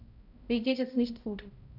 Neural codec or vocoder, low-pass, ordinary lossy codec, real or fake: codec, 16 kHz, 0.5 kbps, X-Codec, HuBERT features, trained on balanced general audio; 5.4 kHz; none; fake